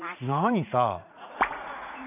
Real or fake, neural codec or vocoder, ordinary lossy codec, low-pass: fake; vocoder, 44.1 kHz, 80 mel bands, Vocos; none; 3.6 kHz